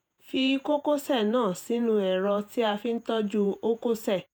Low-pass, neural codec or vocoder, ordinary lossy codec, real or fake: none; vocoder, 48 kHz, 128 mel bands, Vocos; none; fake